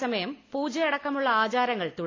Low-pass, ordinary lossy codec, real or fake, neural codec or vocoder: 7.2 kHz; AAC, 32 kbps; real; none